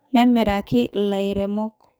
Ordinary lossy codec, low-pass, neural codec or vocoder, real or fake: none; none; codec, 44.1 kHz, 2.6 kbps, SNAC; fake